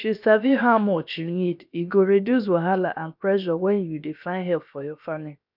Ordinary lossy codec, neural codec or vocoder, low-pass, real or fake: none; codec, 16 kHz, 0.7 kbps, FocalCodec; 5.4 kHz; fake